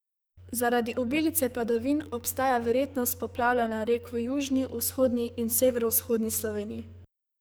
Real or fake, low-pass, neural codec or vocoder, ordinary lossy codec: fake; none; codec, 44.1 kHz, 2.6 kbps, SNAC; none